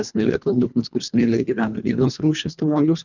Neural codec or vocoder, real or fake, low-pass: codec, 24 kHz, 1.5 kbps, HILCodec; fake; 7.2 kHz